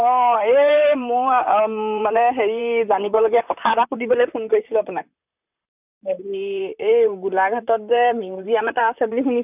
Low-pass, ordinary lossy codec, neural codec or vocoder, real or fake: 3.6 kHz; none; vocoder, 44.1 kHz, 128 mel bands, Pupu-Vocoder; fake